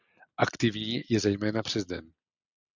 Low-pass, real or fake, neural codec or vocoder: 7.2 kHz; real; none